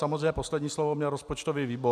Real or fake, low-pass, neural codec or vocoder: real; 14.4 kHz; none